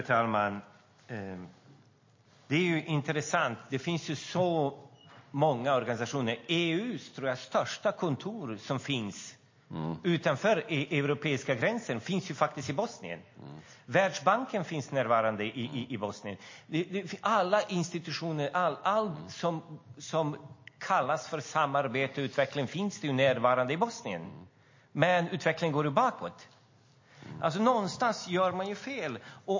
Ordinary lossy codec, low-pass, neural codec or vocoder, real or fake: MP3, 32 kbps; 7.2 kHz; none; real